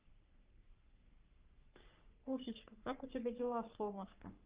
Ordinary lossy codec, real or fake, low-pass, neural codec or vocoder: Opus, 24 kbps; fake; 3.6 kHz; codec, 44.1 kHz, 3.4 kbps, Pupu-Codec